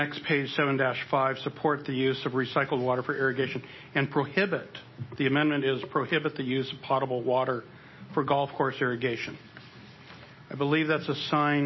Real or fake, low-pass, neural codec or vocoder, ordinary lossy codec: real; 7.2 kHz; none; MP3, 24 kbps